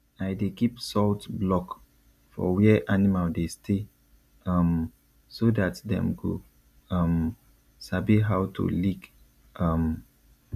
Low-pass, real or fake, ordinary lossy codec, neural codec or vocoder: 14.4 kHz; real; none; none